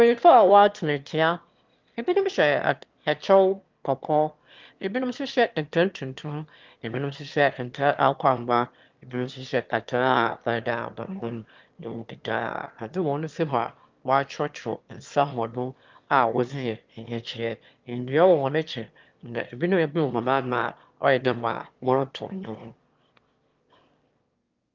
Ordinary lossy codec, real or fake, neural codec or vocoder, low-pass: Opus, 24 kbps; fake; autoencoder, 22.05 kHz, a latent of 192 numbers a frame, VITS, trained on one speaker; 7.2 kHz